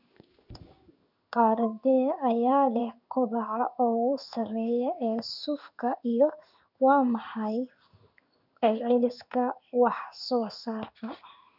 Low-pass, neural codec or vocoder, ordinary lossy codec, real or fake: 5.4 kHz; codec, 16 kHz in and 24 kHz out, 1 kbps, XY-Tokenizer; none; fake